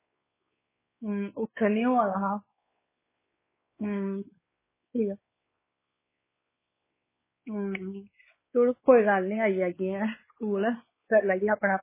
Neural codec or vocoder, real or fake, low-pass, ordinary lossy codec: codec, 16 kHz, 4 kbps, X-Codec, WavLM features, trained on Multilingual LibriSpeech; fake; 3.6 kHz; MP3, 16 kbps